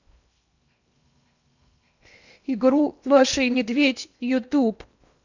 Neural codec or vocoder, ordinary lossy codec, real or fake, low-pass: codec, 16 kHz in and 24 kHz out, 0.8 kbps, FocalCodec, streaming, 65536 codes; none; fake; 7.2 kHz